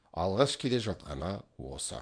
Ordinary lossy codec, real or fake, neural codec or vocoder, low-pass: MP3, 64 kbps; fake; codec, 24 kHz, 0.9 kbps, WavTokenizer, small release; 9.9 kHz